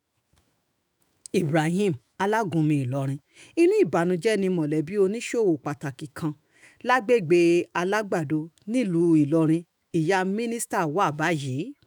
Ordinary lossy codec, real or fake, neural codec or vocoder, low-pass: none; fake; autoencoder, 48 kHz, 128 numbers a frame, DAC-VAE, trained on Japanese speech; none